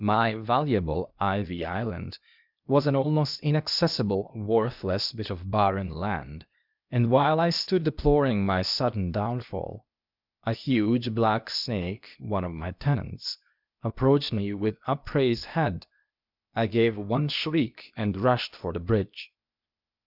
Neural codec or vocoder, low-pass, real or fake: codec, 16 kHz, 0.8 kbps, ZipCodec; 5.4 kHz; fake